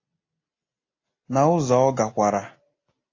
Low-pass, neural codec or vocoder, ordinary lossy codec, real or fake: 7.2 kHz; none; AAC, 32 kbps; real